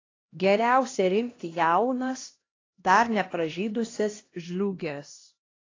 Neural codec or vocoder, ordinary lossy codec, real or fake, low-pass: codec, 16 kHz, 1 kbps, X-Codec, HuBERT features, trained on LibriSpeech; AAC, 32 kbps; fake; 7.2 kHz